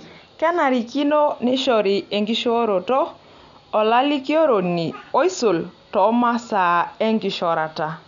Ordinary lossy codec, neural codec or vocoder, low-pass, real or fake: none; none; 7.2 kHz; real